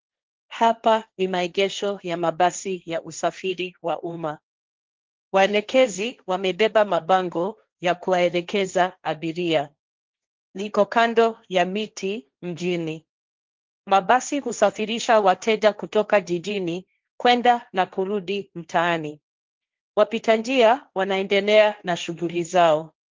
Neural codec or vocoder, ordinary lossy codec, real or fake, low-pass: codec, 16 kHz, 1.1 kbps, Voila-Tokenizer; Opus, 32 kbps; fake; 7.2 kHz